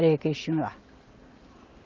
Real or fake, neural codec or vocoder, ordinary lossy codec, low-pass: real; none; Opus, 24 kbps; 7.2 kHz